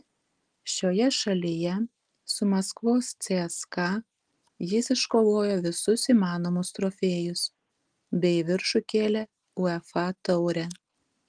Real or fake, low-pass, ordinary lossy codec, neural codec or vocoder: real; 9.9 kHz; Opus, 24 kbps; none